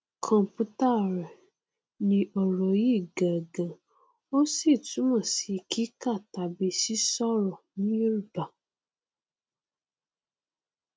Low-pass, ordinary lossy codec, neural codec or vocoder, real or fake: none; none; none; real